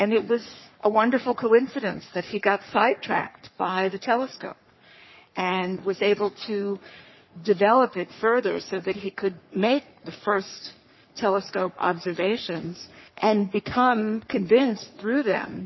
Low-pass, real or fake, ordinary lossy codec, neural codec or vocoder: 7.2 kHz; fake; MP3, 24 kbps; codec, 44.1 kHz, 3.4 kbps, Pupu-Codec